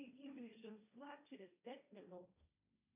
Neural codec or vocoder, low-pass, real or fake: codec, 16 kHz, 1.1 kbps, Voila-Tokenizer; 3.6 kHz; fake